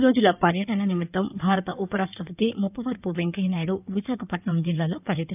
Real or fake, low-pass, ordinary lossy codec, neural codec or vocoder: fake; 3.6 kHz; none; codec, 16 kHz in and 24 kHz out, 2.2 kbps, FireRedTTS-2 codec